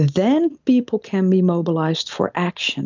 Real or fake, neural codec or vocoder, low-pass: real; none; 7.2 kHz